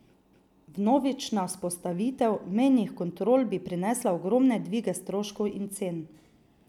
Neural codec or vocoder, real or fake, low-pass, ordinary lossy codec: none; real; 19.8 kHz; none